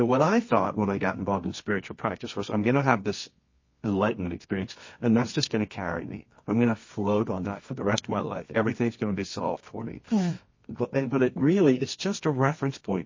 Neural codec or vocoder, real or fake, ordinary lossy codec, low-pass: codec, 24 kHz, 0.9 kbps, WavTokenizer, medium music audio release; fake; MP3, 32 kbps; 7.2 kHz